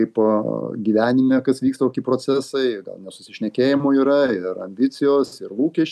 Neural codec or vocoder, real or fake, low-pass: none; real; 14.4 kHz